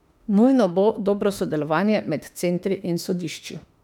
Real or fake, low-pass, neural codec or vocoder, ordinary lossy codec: fake; 19.8 kHz; autoencoder, 48 kHz, 32 numbers a frame, DAC-VAE, trained on Japanese speech; none